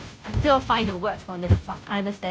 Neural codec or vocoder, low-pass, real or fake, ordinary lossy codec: codec, 16 kHz, 0.5 kbps, FunCodec, trained on Chinese and English, 25 frames a second; none; fake; none